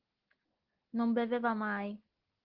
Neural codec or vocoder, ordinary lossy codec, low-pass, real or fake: none; Opus, 16 kbps; 5.4 kHz; real